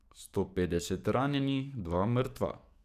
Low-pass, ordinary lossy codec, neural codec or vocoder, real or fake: 14.4 kHz; none; codec, 44.1 kHz, 7.8 kbps, DAC; fake